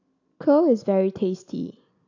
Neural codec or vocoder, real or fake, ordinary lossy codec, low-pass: none; real; AAC, 48 kbps; 7.2 kHz